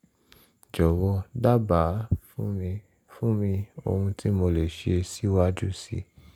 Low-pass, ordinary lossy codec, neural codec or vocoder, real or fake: 19.8 kHz; none; none; real